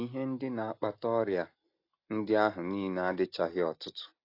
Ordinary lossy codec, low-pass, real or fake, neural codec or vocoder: AAC, 32 kbps; 5.4 kHz; real; none